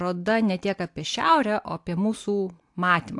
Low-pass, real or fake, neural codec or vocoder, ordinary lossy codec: 10.8 kHz; real; none; AAC, 64 kbps